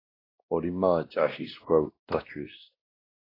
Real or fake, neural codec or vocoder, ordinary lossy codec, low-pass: fake; codec, 16 kHz, 1 kbps, X-Codec, WavLM features, trained on Multilingual LibriSpeech; AAC, 24 kbps; 5.4 kHz